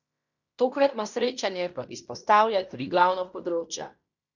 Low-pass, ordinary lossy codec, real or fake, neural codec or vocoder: 7.2 kHz; none; fake; codec, 16 kHz in and 24 kHz out, 0.9 kbps, LongCat-Audio-Codec, fine tuned four codebook decoder